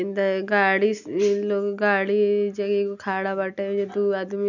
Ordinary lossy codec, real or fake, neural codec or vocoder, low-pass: none; real; none; 7.2 kHz